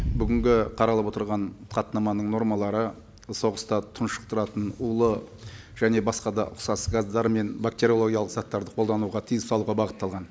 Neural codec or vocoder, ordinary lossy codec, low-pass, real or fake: none; none; none; real